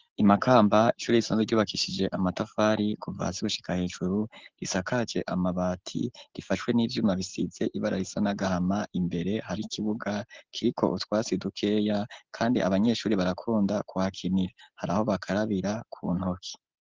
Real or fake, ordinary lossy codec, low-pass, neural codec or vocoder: real; Opus, 16 kbps; 7.2 kHz; none